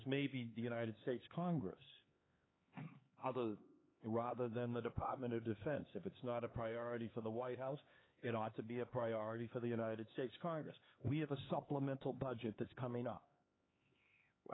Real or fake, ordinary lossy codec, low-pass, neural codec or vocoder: fake; AAC, 16 kbps; 7.2 kHz; codec, 16 kHz, 4 kbps, X-Codec, HuBERT features, trained on LibriSpeech